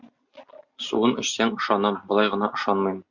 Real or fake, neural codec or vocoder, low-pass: real; none; 7.2 kHz